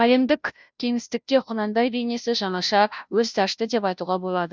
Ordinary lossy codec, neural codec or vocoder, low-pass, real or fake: none; codec, 16 kHz, 0.5 kbps, FunCodec, trained on Chinese and English, 25 frames a second; none; fake